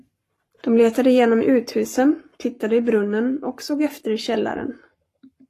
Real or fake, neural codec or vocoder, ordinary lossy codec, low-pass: fake; codec, 44.1 kHz, 7.8 kbps, Pupu-Codec; AAC, 48 kbps; 14.4 kHz